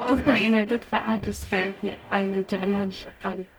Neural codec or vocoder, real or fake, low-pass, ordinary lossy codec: codec, 44.1 kHz, 0.9 kbps, DAC; fake; none; none